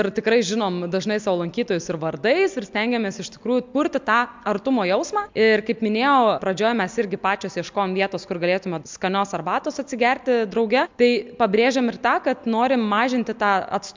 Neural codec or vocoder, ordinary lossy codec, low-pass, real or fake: none; MP3, 64 kbps; 7.2 kHz; real